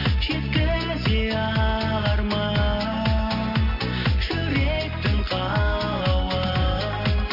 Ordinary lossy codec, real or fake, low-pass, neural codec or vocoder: none; real; 5.4 kHz; none